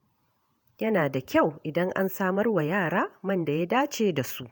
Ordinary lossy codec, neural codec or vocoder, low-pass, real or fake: none; vocoder, 48 kHz, 128 mel bands, Vocos; none; fake